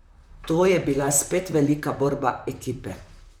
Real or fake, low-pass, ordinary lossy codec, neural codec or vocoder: fake; 19.8 kHz; none; vocoder, 44.1 kHz, 128 mel bands, Pupu-Vocoder